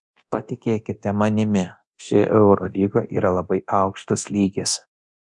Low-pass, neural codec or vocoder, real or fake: 10.8 kHz; codec, 24 kHz, 0.9 kbps, DualCodec; fake